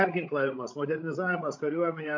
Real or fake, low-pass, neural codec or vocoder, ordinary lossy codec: fake; 7.2 kHz; codec, 16 kHz, 16 kbps, FreqCodec, larger model; MP3, 48 kbps